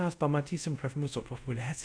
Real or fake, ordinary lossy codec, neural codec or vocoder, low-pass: fake; AAC, 64 kbps; codec, 24 kHz, 0.5 kbps, DualCodec; 9.9 kHz